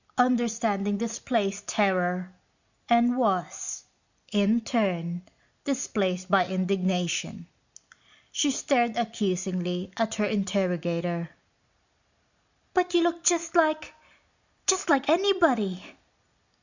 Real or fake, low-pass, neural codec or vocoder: real; 7.2 kHz; none